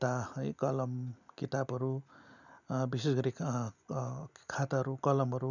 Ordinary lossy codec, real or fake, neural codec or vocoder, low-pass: none; real; none; 7.2 kHz